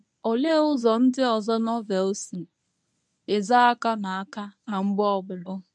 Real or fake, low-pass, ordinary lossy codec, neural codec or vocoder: fake; none; none; codec, 24 kHz, 0.9 kbps, WavTokenizer, medium speech release version 1